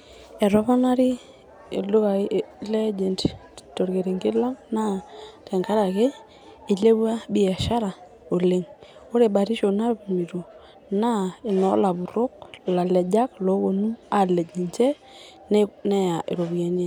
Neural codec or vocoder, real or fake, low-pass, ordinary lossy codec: none; real; 19.8 kHz; none